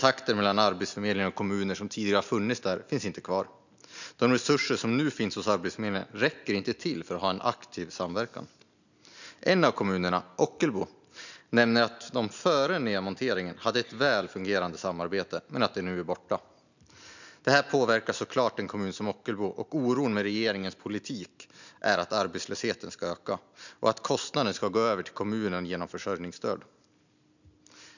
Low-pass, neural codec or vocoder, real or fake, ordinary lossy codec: 7.2 kHz; none; real; none